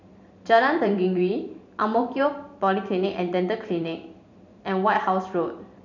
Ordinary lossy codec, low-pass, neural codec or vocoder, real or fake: none; 7.2 kHz; vocoder, 44.1 kHz, 128 mel bands every 512 samples, BigVGAN v2; fake